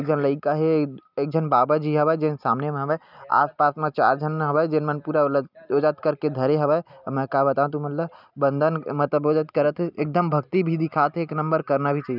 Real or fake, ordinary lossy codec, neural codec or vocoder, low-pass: real; none; none; 5.4 kHz